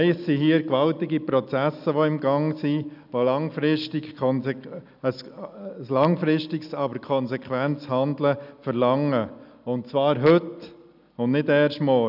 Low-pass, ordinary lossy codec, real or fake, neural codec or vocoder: 5.4 kHz; none; real; none